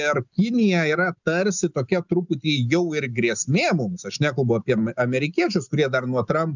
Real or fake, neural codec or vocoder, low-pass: real; none; 7.2 kHz